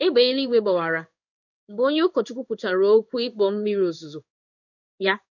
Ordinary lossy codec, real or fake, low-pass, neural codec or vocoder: none; fake; 7.2 kHz; codec, 16 kHz in and 24 kHz out, 1 kbps, XY-Tokenizer